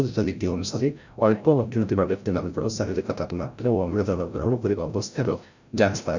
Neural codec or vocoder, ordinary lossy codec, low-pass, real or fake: codec, 16 kHz, 0.5 kbps, FreqCodec, larger model; none; 7.2 kHz; fake